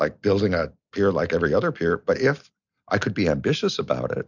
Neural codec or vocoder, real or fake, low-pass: none; real; 7.2 kHz